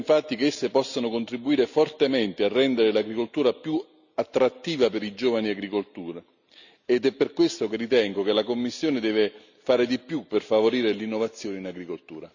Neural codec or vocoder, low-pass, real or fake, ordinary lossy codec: none; 7.2 kHz; real; none